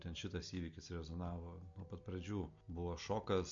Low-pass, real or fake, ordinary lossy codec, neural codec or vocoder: 7.2 kHz; real; MP3, 48 kbps; none